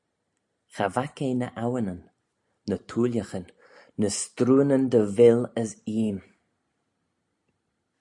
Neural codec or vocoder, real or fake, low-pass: none; real; 10.8 kHz